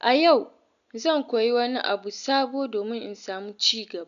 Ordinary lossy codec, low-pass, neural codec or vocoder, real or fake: none; 7.2 kHz; none; real